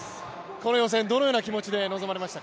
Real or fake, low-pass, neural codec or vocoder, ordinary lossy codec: real; none; none; none